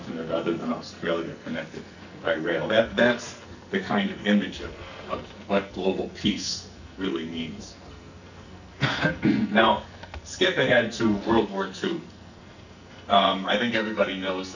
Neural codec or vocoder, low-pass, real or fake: codec, 44.1 kHz, 2.6 kbps, SNAC; 7.2 kHz; fake